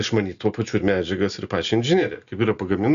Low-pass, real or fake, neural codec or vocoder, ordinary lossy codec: 7.2 kHz; real; none; MP3, 96 kbps